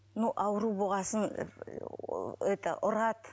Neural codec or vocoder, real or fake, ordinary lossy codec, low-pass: none; real; none; none